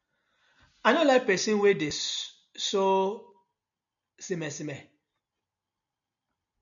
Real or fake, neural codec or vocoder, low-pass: real; none; 7.2 kHz